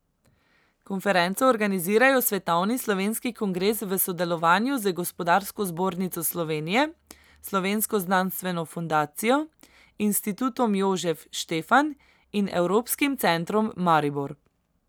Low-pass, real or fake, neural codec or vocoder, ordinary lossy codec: none; real; none; none